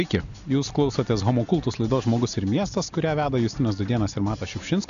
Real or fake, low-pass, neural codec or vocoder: real; 7.2 kHz; none